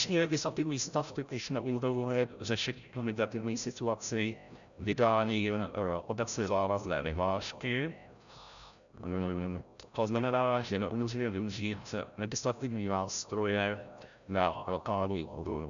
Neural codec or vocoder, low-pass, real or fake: codec, 16 kHz, 0.5 kbps, FreqCodec, larger model; 7.2 kHz; fake